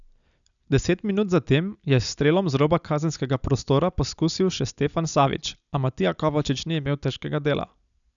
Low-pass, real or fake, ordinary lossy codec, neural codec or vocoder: 7.2 kHz; real; none; none